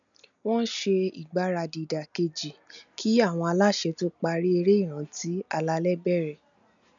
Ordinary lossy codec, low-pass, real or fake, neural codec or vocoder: none; 7.2 kHz; real; none